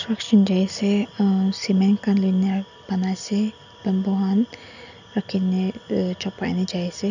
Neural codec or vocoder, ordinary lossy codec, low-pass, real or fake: none; none; 7.2 kHz; real